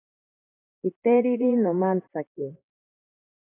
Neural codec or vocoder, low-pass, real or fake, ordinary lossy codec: vocoder, 44.1 kHz, 128 mel bands, Pupu-Vocoder; 3.6 kHz; fake; AAC, 16 kbps